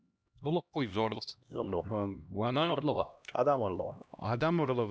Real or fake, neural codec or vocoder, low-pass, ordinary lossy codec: fake; codec, 16 kHz, 1 kbps, X-Codec, HuBERT features, trained on LibriSpeech; none; none